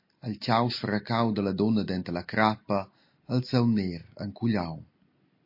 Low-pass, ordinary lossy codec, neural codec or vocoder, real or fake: 5.4 kHz; MP3, 32 kbps; none; real